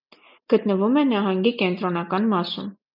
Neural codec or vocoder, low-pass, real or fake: none; 5.4 kHz; real